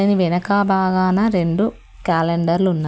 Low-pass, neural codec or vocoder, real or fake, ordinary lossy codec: none; none; real; none